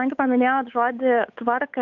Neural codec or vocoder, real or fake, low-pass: codec, 16 kHz, 8 kbps, FunCodec, trained on Chinese and English, 25 frames a second; fake; 7.2 kHz